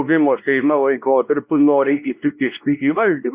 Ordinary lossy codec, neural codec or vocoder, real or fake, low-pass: Opus, 64 kbps; codec, 16 kHz, 1 kbps, X-Codec, WavLM features, trained on Multilingual LibriSpeech; fake; 3.6 kHz